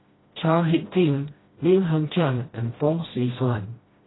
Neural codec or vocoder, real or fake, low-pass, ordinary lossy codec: codec, 16 kHz, 1 kbps, FreqCodec, smaller model; fake; 7.2 kHz; AAC, 16 kbps